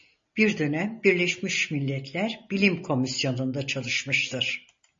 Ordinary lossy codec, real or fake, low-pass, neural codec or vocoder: MP3, 32 kbps; real; 7.2 kHz; none